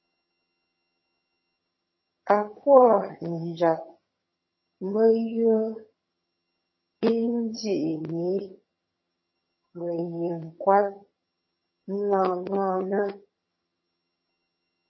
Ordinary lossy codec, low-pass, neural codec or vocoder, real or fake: MP3, 24 kbps; 7.2 kHz; vocoder, 22.05 kHz, 80 mel bands, HiFi-GAN; fake